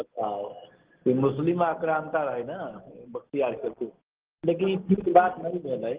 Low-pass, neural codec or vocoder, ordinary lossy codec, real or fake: 3.6 kHz; none; Opus, 16 kbps; real